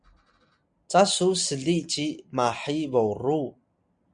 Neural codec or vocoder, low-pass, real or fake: none; 9.9 kHz; real